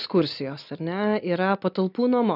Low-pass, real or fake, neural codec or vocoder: 5.4 kHz; real; none